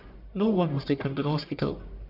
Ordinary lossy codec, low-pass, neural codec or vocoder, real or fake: MP3, 48 kbps; 5.4 kHz; codec, 44.1 kHz, 1.7 kbps, Pupu-Codec; fake